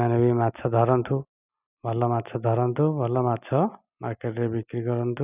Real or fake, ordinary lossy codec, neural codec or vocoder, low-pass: real; none; none; 3.6 kHz